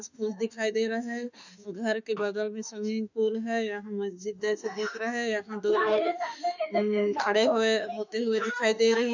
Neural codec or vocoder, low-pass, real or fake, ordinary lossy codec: autoencoder, 48 kHz, 32 numbers a frame, DAC-VAE, trained on Japanese speech; 7.2 kHz; fake; none